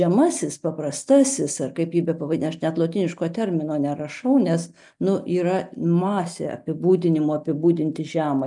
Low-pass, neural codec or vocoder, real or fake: 10.8 kHz; none; real